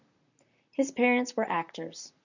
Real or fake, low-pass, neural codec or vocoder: fake; 7.2 kHz; vocoder, 44.1 kHz, 128 mel bands, Pupu-Vocoder